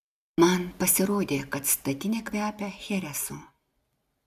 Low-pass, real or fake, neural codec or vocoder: 14.4 kHz; real; none